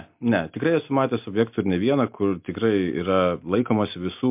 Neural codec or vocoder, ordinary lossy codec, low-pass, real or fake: none; MP3, 32 kbps; 3.6 kHz; real